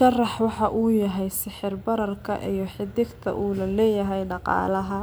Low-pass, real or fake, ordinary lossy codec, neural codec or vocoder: none; real; none; none